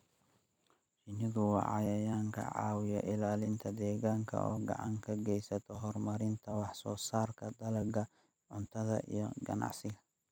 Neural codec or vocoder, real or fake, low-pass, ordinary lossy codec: vocoder, 44.1 kHz, 128 mel bands every 256 samples, BigVGAN v2; fake; none; none